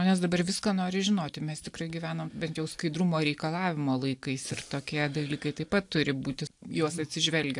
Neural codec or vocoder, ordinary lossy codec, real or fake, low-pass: none; AAC, 64 kbps; real; 10.8 kHz